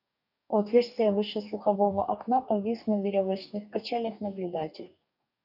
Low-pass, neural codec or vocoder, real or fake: 5.4 kHz; codec, 44.1 kHz, 2.6 kbps, DAC; fake